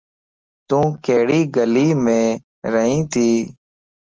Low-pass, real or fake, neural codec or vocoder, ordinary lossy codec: 7.2 kHz; real; none; Opus, 24 kbps